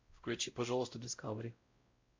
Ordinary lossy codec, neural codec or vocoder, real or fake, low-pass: MP3, 48 kbps; codec, 16 kHz, 0.5 kbps, X-Codec, WavLM features, trained on Multilingual LibriSpeech; fake; 7.2 kHz